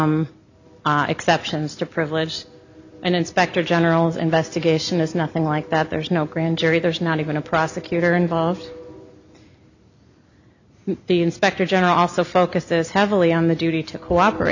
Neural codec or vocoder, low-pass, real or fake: none; 7.2 kHz; real